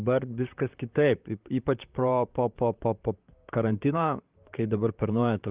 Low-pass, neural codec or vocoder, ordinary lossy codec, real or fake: 3.6 kHz; vocoder, 44.1 kHz, 128 mel bands, Pupu-Vocoder; Opus, 32 kbps; fake